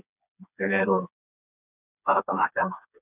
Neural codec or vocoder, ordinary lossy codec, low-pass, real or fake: codec, 16 kHz, 2 kbps, FreqCodec, smaller model; none; 3.6 kHz; fake